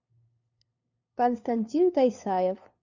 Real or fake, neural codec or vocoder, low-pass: fake; codec, 16 kHz, 2 kbps, FunCodec, trained on LibriTTS, 25 frames a second; 7.2 kHz